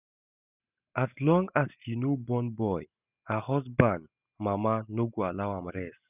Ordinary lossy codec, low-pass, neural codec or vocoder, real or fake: none; 3.6 kHz; none; real